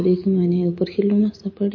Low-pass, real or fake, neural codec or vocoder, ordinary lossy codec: 7.2 kHz; real; none; MP3, 32 kbps